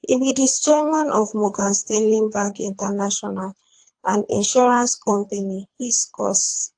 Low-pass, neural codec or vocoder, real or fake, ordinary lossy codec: 9.9 kHz; codec, 24 kHz, 3 kbps, HILCodec; fake; AAC, 64 kbps